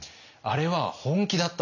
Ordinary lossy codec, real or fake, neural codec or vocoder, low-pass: none; real; none; 7.2 kHz